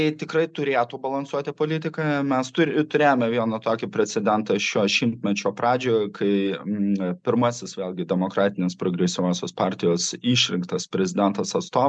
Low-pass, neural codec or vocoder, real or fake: 9.9 kHz; none; real